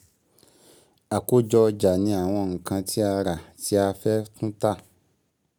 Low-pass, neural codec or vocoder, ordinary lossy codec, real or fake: none; none; none; real